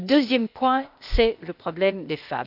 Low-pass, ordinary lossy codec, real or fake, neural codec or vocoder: 5.4 kHz; none; fake; codec, 16 kHz, 0.8 kbps, ZipCodec